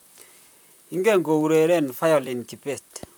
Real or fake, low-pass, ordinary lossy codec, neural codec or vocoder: fake; none; none; vocoder, 44.1 kHz, 128 mel bands, Pupu-Vocoder